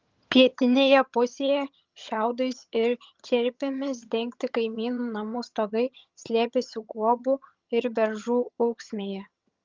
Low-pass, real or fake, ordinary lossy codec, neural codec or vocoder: 7.2 kHz; fake; Opus, 24 kbps; vocoder, 22.05 kHz, 80 mel bands, HiFi-GAN